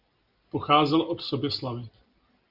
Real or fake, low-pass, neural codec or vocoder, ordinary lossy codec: real; 5.4 kHz; none; Opus, 32 kbps